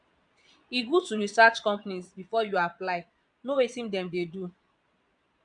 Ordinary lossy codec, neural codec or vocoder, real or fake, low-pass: none; vocoder, 22.05 kHz, 80 mel bands, Vocos; fake; 9.9 kHz